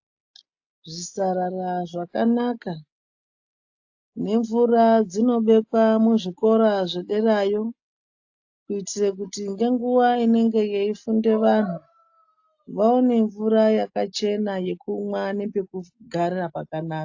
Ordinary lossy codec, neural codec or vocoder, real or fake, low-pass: AAC, 48 kbps; none; real; 7.2 kHz